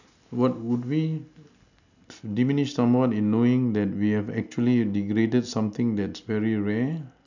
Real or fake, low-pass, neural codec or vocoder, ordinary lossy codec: real; 7.2 kHz; none; none